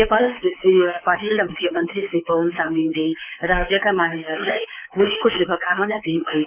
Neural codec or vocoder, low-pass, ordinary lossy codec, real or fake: codec, 24 kHz, 3.1 kbps, DualCodec; 3.6 kHz; Opus, 24 kbps; fake